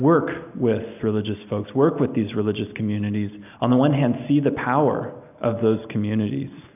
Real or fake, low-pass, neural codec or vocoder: real; 3.6 kHz; none